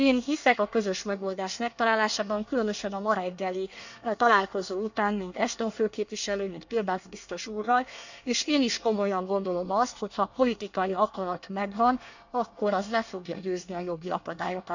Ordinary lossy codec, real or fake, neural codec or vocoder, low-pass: none; fake; codec, 24 kHz, 1 kbps, SNAC; 7.2 kHz